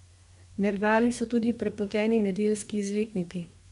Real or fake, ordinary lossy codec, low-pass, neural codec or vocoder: fake; none; 10.8 kHz; codec, 24 kHz, 1 kbps, SNAC